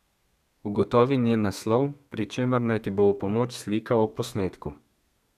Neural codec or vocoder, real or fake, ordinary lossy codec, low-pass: codec, 32 kHz, 1.9 kbps, SNAC; fake; none; 14.4 kHz